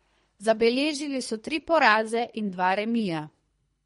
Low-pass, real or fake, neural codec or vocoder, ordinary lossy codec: 10.8 kHz; fake; codec, 24 kHz, 3 kbps, HILCodec; MP3, 48 kbps